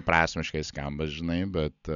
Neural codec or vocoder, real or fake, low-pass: none; real; 7.2 kHz